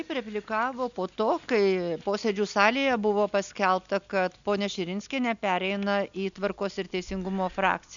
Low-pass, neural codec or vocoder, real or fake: 7.2 kHz; none; real